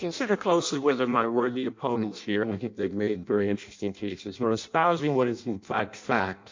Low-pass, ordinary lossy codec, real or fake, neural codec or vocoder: 7.2 kHz; MP3, 48 kbps; fake; codec, 16 kHz in and 24 kHz out, 0.6 kbps, FireRedTTS-2 codec